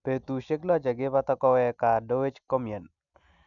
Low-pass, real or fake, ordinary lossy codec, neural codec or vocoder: 7.2 kHz; real; none; none